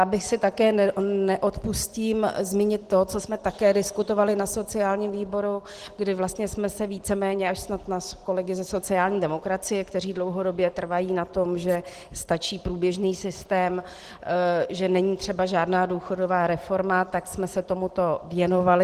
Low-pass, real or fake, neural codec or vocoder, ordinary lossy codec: 14.4 kHz; real; none; Opus, 16 kbps